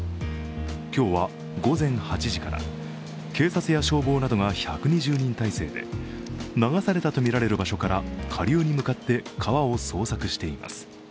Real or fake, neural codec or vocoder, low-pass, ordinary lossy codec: real; none; none; none